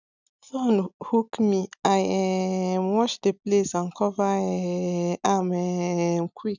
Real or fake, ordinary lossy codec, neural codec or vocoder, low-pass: real; none; none; 7.2 kHz